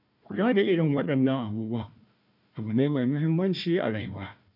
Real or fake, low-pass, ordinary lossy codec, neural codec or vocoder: fake; 5.4 kHz; none; codec, 16 kHz, 1 kbps, FunCodec, trained on Chinese and English, 50 frames a second